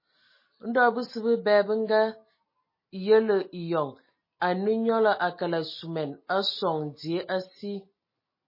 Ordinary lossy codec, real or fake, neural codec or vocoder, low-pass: MP3, 24 kbps; real; none; 5.4 kHz